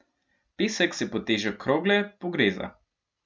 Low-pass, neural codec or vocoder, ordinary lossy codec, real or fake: none; none; none; real